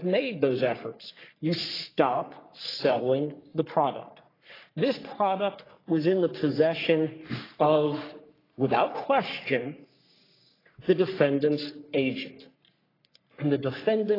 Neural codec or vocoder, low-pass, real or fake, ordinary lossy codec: codec, 44.1 kHz, 3.4 kbps, Pupu-Codec; 5.4 kHz; fake; AAC, 24 kbps